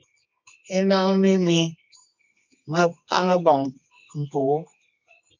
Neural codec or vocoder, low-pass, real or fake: codec, 24 kHz, 0.9 kbps, WavTokenizer, medium music audio release; 7.2 kHz; fake